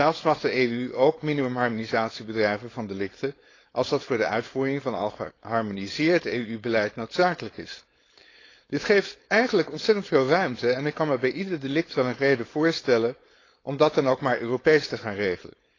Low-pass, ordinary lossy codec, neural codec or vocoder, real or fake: 7.2 kHz; AAC, 32 kbps; codec, 16 kHz, 4.8 kbps, FACodec; fake